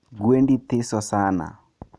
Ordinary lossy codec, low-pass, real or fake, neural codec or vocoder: none; none; real; none